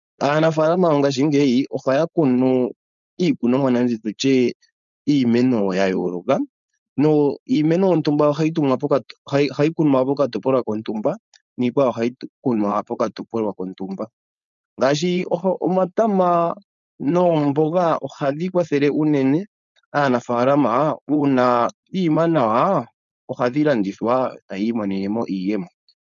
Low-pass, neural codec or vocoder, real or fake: 7.2 kHz; codec, 16 kHz, 4.8 kbps, FACodec; fake